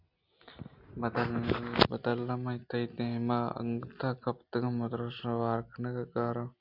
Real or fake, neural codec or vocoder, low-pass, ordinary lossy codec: real; none; 5.4 kHz; MP3, 48 kbps